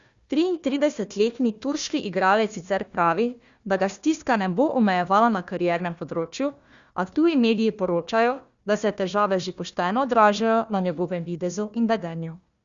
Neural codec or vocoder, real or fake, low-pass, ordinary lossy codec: codec, 16 kHz, 1 kbps, FunCodec, trained on Chinese and English, 50 frames a second; fake; 7.2 kHz; Opus, 64 kbps